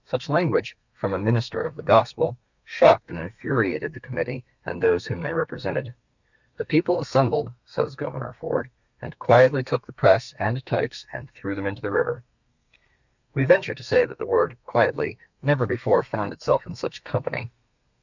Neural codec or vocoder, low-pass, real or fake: codec, 32 kHz, 1.9 kbps, SNAC; 7.2 kHz; fake